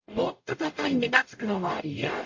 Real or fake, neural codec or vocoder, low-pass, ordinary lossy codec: fake; codec, 44.1 kHz, 0.9 kbps, DAC; 7.2 kHz; MP3, 48 kbps